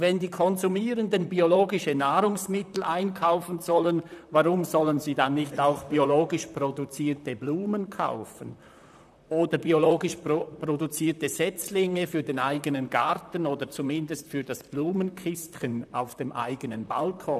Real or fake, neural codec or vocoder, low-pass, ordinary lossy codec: fake; vocoder, 44.1 kHz, 128 mel bands, Pupu-Vocoder; 14.4 kHz; none